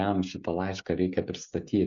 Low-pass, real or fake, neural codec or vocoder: 7.2 kHz; fake; codec, 16 kHz, 16 kbps, FreqCodec, smaller model